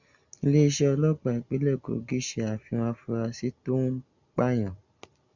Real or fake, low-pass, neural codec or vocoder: real; 7.2 kHz; none